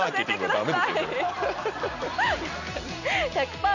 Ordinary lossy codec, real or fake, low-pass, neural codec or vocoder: none; real; 7.2 kHz; none